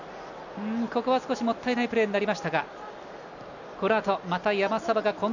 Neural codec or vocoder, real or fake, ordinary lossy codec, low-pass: none; real; none; 7.2 kHz